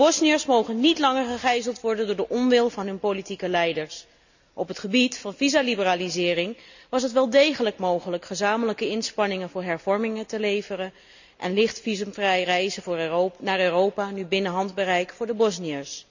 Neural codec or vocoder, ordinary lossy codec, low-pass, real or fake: none; none; 7.2 kHz; real